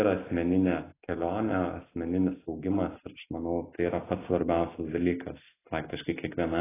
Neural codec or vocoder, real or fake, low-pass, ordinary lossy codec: none; real; 3.6 kHz; AAC, 16 kbps